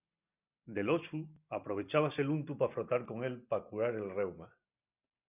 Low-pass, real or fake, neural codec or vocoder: 3.6 kHz; real; none